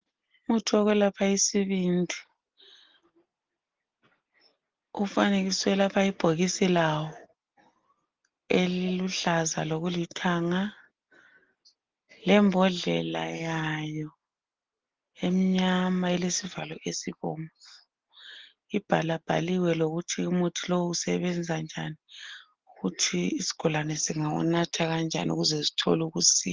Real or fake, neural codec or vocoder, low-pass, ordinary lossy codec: real; none; 7.2 kHz; Opus, 16 kbps